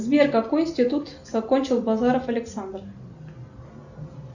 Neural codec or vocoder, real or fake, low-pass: none; real; 7.2 kHz